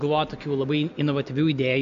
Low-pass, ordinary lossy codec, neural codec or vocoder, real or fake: 7.2 kHz; MP3, 64 kbps; none; real